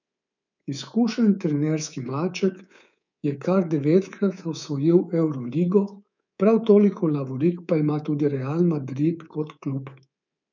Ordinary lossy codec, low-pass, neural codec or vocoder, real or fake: none; 7.2 kHz; codec, 24 kHz, 3.1 kbps, DualCodec; fake